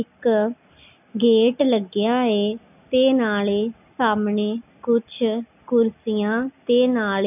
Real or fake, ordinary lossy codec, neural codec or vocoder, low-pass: real; none; none; 3.6 kHz